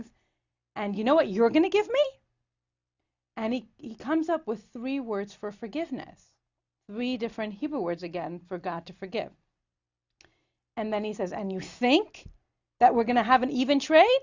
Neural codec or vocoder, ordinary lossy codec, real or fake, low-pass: none; Opus, 64 kbps; real; 7.2 kHz